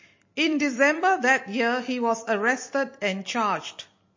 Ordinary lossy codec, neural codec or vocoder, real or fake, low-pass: MP3, 32 kbps; vocoder, 44.1 kHz, 128 mel bands every 256 samples, BigVGAN v2; fake; 7.2 kHz